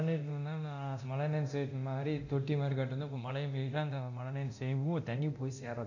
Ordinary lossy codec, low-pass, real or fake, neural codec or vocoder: none; 7.2 kHz; fake; codec, 24 kHz, 0.9 kbps, DualCodec